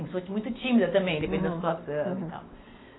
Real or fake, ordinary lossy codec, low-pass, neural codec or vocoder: real; AAC, 16 kbps; 7.2 kHz; none